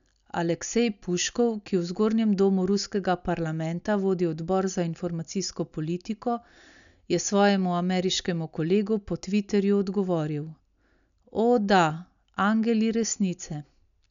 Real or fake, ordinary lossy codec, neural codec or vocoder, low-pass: real; none; none; 7.2 kHz